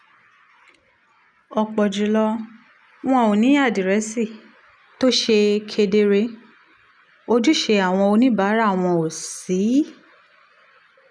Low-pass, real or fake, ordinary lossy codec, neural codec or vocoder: 9.9 kHz; real; none; none